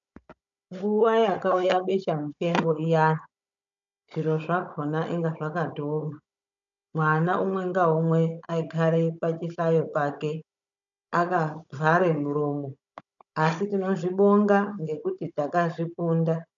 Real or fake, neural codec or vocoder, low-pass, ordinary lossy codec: fake; codec, 16 kHz, 16 kbps, FunCodec, trained on Chinese and English, 50 frames a second; 7.2 kHz; MP3, 96 kbps